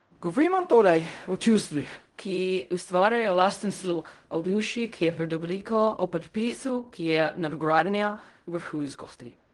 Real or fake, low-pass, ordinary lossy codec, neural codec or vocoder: fake; 10.8 kHz; Opus, 24 kbps; codec, 16 kHz in and 24 kHz out, 0.4 kbps, LongCat-Audio-Codec, fine tuned four codebook decoder